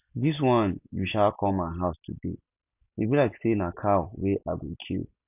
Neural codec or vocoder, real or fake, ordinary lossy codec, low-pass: none; real; none; 3.6 kHz